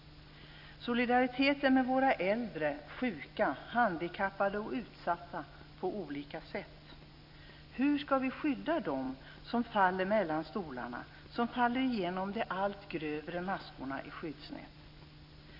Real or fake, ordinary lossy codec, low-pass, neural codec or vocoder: real; none; 5.4 kHz; none